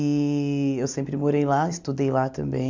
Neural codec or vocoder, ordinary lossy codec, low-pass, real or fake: none; MP3, 64 kbps; 7.2 kHz; real